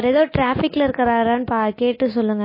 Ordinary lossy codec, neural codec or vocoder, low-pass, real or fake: MP3, 24 kbps; none; 5.4 kHz; real